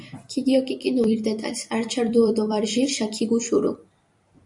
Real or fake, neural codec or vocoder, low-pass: fake; vocoder, 44.1 kHz, 128 mel bands every 256 samples, BigVGAN v2; 10.8 kHz